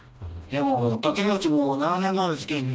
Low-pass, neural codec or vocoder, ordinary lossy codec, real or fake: none; codec, 16 kHz, 1 kbps, FreqCodec, smaller model; none; fake